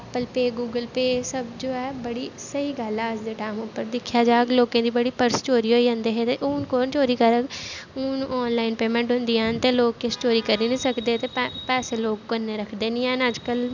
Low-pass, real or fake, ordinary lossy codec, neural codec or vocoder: 7.2 kHz; real; none; none